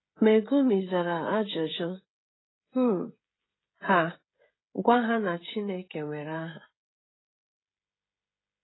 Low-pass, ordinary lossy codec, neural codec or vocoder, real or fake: 7.2 kHz; AAC, 16 kbps; codec, 16 kHz, 16 kbps, FreqCodec, smaller model; fake